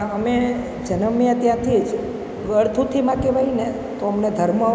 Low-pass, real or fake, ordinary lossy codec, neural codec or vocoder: none; real; none; none